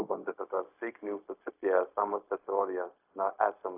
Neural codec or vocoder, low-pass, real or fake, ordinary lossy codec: codec, 16 kHz, 0.4 kbps, LongCat-Audio-Codec; 3.6 kHz; fake; AAC, 32 kbps